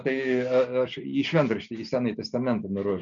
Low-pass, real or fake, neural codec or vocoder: 7.2 kHz; real; none